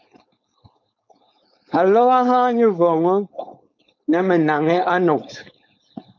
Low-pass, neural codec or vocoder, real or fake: 7.2 kHz; codec, 16 kHz, 4.8 kbps, FACodec; fake